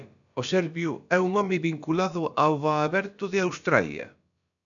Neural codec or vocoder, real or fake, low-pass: codec, 16 kHz, about 1 kbps, DyCAST, with the encoder's durations; fake; 7.2 kHz